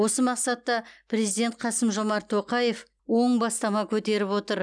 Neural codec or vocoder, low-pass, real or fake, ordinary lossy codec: none; 9.9 kHz; real; none